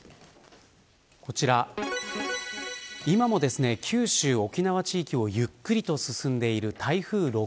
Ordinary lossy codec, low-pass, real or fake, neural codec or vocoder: none; none; real; none